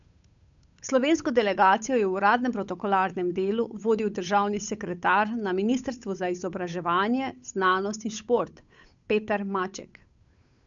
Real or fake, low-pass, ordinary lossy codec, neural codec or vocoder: fake; 7.2 kHz; none; codec, 16 kHz, 8 kbps, FunCodec, trained on Chinese and English, 25 frames a second